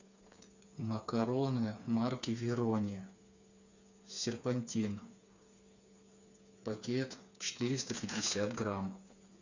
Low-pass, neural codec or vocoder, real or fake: 7.2 kHz; codec, 16 kHz, 4 kbps, FreqCodec, smaller model; fake